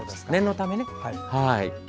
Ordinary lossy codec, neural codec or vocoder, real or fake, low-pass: none; none; real; none